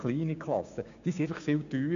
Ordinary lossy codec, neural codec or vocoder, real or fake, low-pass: none; codec, 16 kHz, 6 kbps, DAC; fake; 7.2 kHz